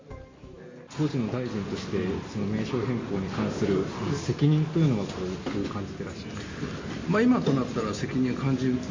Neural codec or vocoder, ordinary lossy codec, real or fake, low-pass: none; MP3, 32 kbps; real; 7.2 kHz